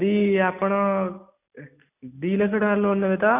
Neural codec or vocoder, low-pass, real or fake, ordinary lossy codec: none; 3.6 kHz; real; none